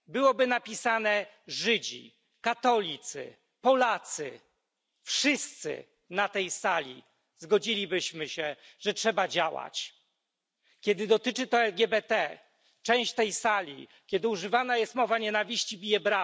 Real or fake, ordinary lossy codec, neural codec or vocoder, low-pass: real; none; none; none